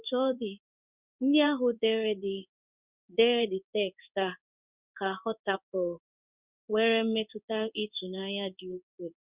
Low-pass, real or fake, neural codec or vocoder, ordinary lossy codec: 3.6 kHz; fake; codec, 16 kHz in and 24 kHz out, 1 kbps, XY-Tokenizer; Opus, 64 kbps